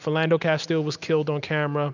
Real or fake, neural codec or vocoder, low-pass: real; none; 7.2 kHz